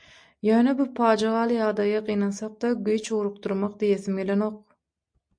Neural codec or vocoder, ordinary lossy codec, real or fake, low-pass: none; MP3, 64 kbps; real; 9.9 kHz